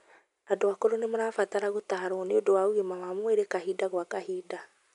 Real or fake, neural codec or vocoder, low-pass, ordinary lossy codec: real; none; 10.8 kHz; none